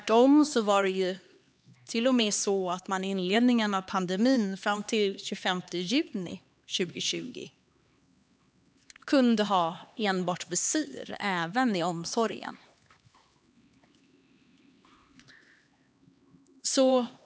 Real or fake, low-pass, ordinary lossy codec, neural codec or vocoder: fake; none; none; codec, 16 kHz, 2 kbps, X-Codec, HuBERT features, trained on LibriSpeech